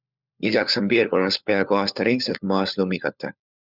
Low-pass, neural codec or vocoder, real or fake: 5.4 kHz; codec, 16 kHz, 4 kbps, FunCodec, trained on LibriTTS, 50 frames a second; fake